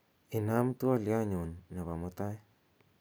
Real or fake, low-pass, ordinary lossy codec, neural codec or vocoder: real; none; none; none